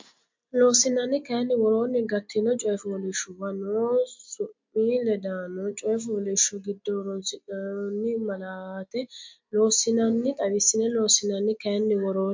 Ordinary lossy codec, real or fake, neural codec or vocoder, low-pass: MP3, 48 kbps; real; none; 7.2 kHz